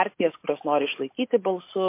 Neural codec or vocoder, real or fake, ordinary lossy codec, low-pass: none; real; MP3, 24 kbps; 3.6 kHz